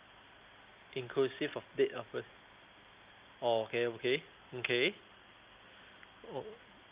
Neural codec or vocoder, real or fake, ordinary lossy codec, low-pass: none; real; Opus, 24 kbps; 3.6 kHz